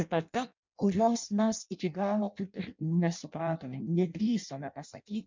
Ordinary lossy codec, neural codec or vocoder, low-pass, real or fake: MP3, 64 kbps; codec, 16 kHz in and 24 kHz out, 0.6 kbps, FireRedTTS-2 codec; 7.2 kHz; fake